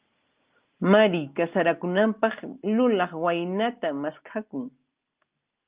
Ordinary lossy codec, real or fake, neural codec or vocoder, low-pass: Opus, 24 kbps; real; none; 3.6 kHz